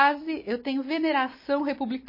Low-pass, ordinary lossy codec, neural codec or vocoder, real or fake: 5.4 kHz; MP3, 24 kbps; none; real